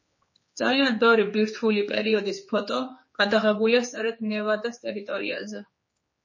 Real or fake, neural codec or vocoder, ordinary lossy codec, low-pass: fake; codec, 16 kHz, 4 kbps, X-Codec, HuBERT features, trained on general audio; MP3, 32 kbps; 7.2 kHz